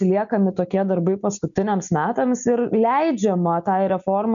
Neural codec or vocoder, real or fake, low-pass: none; real; 7.2 kHz